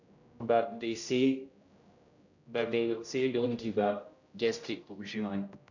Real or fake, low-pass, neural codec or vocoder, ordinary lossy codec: fake; 7.2 kHz; codec, 16 kHz, 0.5 kbps, X-Codec, HuBERT features, trained on general audio; none